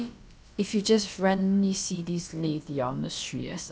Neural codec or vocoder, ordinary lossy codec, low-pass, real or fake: codec, 16 kHz, about 1 kbps, DyCAST, with the encoder's durations; none; none; fake